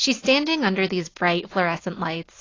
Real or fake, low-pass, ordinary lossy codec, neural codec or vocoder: real; 7.2 kHz; AAC, 32 kbps; none